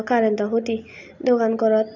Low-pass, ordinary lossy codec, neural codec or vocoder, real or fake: 7.2 kHz; none; none; real